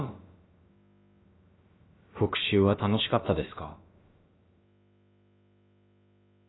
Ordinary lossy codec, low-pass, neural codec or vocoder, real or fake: AAC, 16 kbps; 7.2 kHz; codec, 16 kHz, about 1 kbps, DyCAST, with the encoder's durations; fake